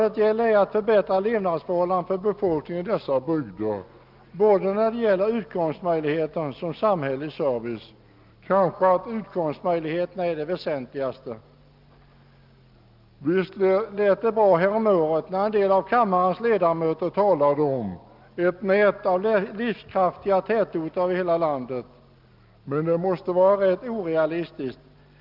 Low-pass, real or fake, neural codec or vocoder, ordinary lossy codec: 5.4 kHz; real; none; Opus, 24 kbps